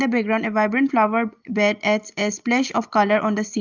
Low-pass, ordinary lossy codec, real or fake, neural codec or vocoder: 7.2 kHz; Opus, 32 kbps; real; none